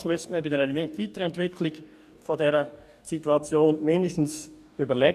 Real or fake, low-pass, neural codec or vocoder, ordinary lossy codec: fake; 14.4 kHz; codec, 44.1 kHz, 2.6 kbps, DAC; none